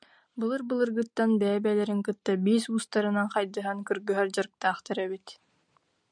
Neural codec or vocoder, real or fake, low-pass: none; real; 9.9 kHz